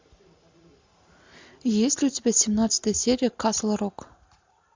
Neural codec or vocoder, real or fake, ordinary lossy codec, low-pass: none; real; MP3, 64 kbps; 7.2 kHz